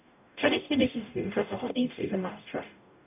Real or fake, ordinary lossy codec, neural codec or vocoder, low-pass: fake; none; codec, 44.1 kHz, 0.9 kbps, DAC; 3.6 kHz